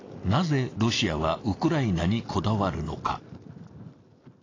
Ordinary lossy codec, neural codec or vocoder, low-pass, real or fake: AAC, 32 kbps; none; 7.2 kHz; real